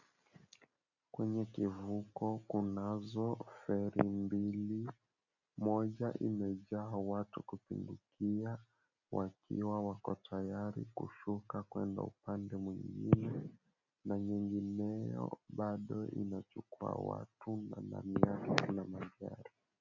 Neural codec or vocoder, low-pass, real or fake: none; 7.2 kHz; real